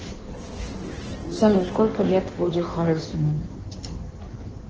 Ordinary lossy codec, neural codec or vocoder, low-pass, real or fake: Opus, 16 kbps; codec, 16 kHz in and 24 kHz out, 1.1 kbps, FireRedTTS-2 codec; 7.2 kHz; fake